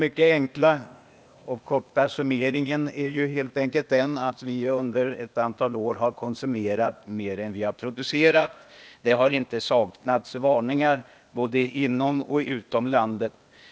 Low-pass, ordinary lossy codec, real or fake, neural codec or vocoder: none; none; fake; codec, 16 kHz, 0.8 kbps, ZipCodec